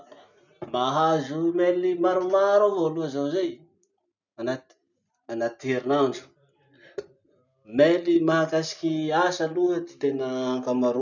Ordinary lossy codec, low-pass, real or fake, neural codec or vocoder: none; 7.2 kHz; real; none